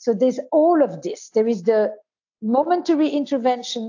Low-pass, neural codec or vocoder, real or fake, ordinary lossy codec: 7.2 kHz; none; real; AAC, 48 kbps